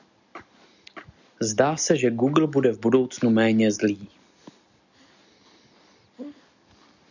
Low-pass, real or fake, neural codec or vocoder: 7.2 kHz; real; none